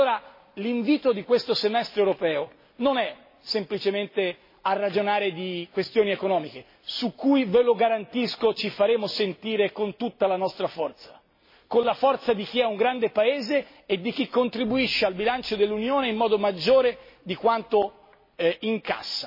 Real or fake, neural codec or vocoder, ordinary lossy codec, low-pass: real; none; MP3, 24 kbps; 5.4 kHz